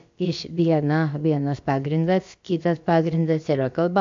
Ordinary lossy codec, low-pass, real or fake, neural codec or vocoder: MP3, 48 kbps; 7.2 kHz; fake; codec, 16 kHz, about 1 kbps, DyCAST, with the encoder's durations